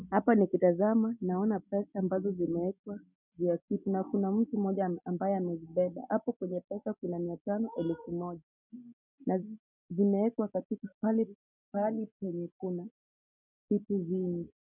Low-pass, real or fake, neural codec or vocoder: 3.6 kHz; real; none